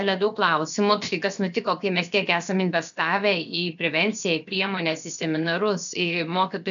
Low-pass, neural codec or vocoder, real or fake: 7.2 kHz; codec, 16 kHz, 0.7 kbps, FocalCodec; fake